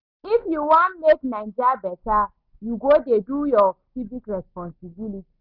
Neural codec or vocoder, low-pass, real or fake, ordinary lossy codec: none; 5.4 kHz; real; none